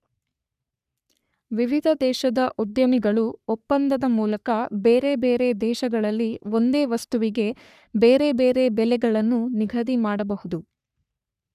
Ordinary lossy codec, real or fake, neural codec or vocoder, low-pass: none; fake; codec, 44.1 kHz, 3.4 kbps, Pupu-Codec; 14.4 kHz